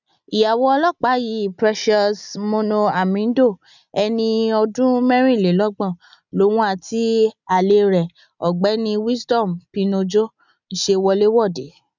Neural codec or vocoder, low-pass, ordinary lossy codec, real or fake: none; 7.2 kHz; none; real